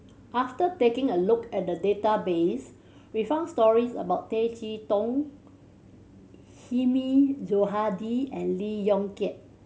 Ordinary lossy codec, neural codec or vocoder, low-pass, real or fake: none; none; none; real